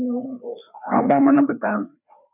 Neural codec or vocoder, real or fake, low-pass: codec, 16 kHz, 2 kbps, FreqCodec, larger model; fake; 3.6 kHz